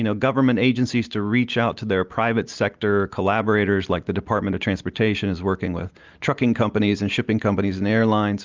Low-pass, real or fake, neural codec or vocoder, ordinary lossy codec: 7.2 kHz; real; none; Opus, 32 kbps